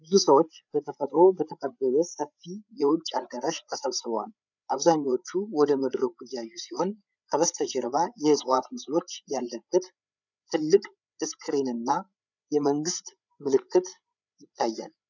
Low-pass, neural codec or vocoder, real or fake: 7.2 kHz; codec, 16 kHz, 8 kbps, FreqCodec, larger model; fake